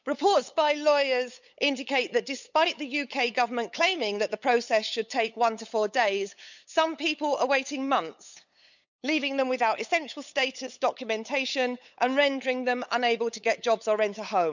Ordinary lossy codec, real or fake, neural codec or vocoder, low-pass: none; fake; codec, 16 kHz, 8 kbps, FunCodec, trained on LibriTTS, 25 frames a second; 7.2 kHz